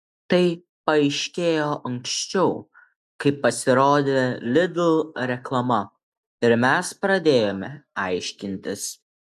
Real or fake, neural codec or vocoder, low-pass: real; none; 14.4 kHz